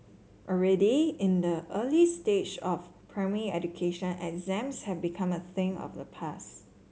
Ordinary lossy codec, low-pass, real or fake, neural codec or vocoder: none; none; real; none